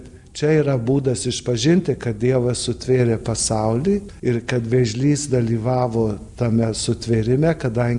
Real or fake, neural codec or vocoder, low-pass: real; none; 10.8 kHz